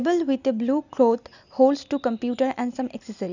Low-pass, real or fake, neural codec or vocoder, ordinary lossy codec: 7.2 kHz; real; none; none